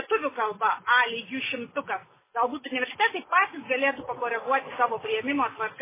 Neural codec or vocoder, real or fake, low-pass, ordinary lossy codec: none; real; 3.6 kHz; MP3, 16 kbps